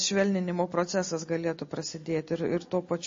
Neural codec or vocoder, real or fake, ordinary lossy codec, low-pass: none; real; MP3, 32 kbps; 7.2 kHz